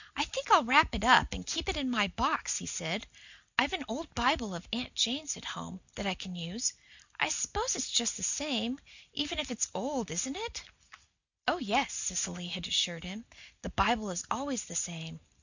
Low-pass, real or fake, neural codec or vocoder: 7.2 kHz; real; none